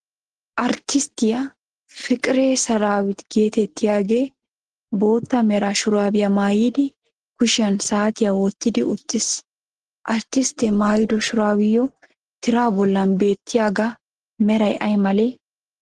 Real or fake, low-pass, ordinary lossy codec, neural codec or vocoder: real; 10.8 kHz; Opus, 16 kbps; none